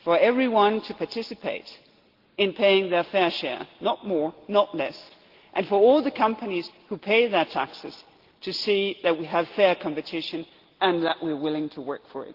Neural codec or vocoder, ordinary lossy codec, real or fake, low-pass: none; Opus, 16 kbps; real; 5.4 kHz